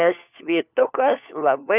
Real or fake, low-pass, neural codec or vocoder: fake; 3.6 kHz; codec, 16 kHz, 4 kbps, FunCodec, trained on LibriTTS, 50 frames a second